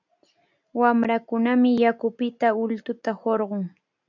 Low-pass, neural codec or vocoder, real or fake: 7.2 kHz; none; real